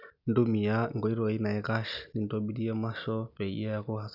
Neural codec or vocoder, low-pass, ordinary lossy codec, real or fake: none; 5.4 kHz; none; real